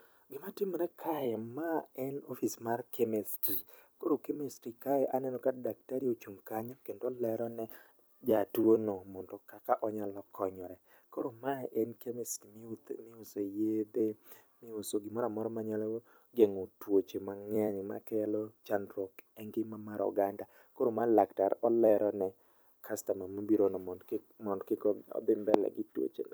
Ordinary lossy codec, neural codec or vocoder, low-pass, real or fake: none; vocoder, 44.1 kHz, 128 mel bands every 256 samples, BigVGAN v2; none; fake